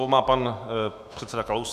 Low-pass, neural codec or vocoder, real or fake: 14.4 kHz; autoencoder, 48 kHz, 128 numbers a frame, DAC-VAE, trained on Japanese speech; fake